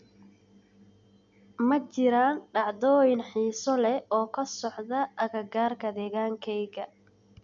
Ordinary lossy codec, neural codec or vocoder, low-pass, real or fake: none; none; 7.2 kHz; real